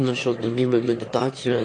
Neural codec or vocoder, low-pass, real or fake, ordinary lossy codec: autoencoder, 22.05 kHz, a latent of 192 numbers a frame, VITS, trained on one speaker; 9.9 kHz; fake; AAC, 48 kbps